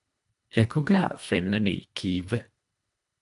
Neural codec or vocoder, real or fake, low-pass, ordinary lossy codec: codec, 24 kHz, 1.5 kbps, HILCodec; fake; 10.8 kHz; AAC, 96 kbps